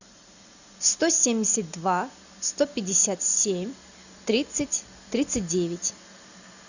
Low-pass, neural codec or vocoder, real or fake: 7.2 kHz; none; real